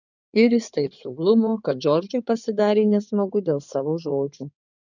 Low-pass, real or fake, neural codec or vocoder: 7.2 kHz; fake; codec, 16 kHz in and 24 kHz out, 2.2 kbps, FireRedTTS-2 codec